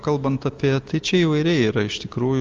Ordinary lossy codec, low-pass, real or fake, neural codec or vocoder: Opus, 32 kbps; 7.2 kHz; real; none